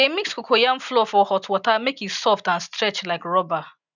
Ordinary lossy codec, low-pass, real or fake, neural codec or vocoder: none; 7.2 kHz; real; none